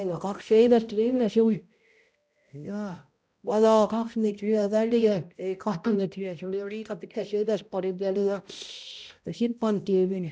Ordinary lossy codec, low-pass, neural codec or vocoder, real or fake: none; none; codec, 16 kHz, 0.5 kbps, X-Codec, HuBERT features, trained on balanced general audio; fake